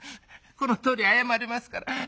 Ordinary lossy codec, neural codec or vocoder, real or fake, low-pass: none; none; real; none